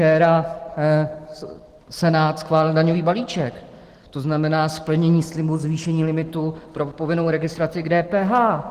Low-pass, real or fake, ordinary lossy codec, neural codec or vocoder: 14.4 kHz; real; Opus, 16 kbps; none